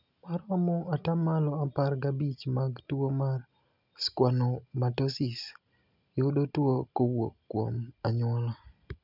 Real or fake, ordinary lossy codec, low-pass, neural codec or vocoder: real; none; 5.4 kHz; none